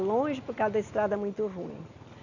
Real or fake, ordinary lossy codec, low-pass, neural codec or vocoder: real; none; 7.2 kHz; none